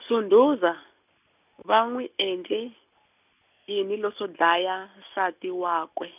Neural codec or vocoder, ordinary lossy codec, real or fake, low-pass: none; none; real; 3.6 kHz